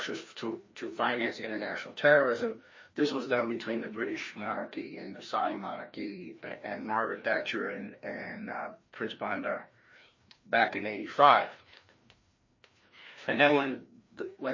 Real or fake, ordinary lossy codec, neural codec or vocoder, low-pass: fake; MP3, 32 kbps; codec, 16 kHz, 1 kbps, FreqCodec, larger model; 7.2 kHz